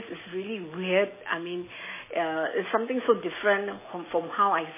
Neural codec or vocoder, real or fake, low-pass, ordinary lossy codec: none; real; 3.6 kHz; MP3, 16 kbps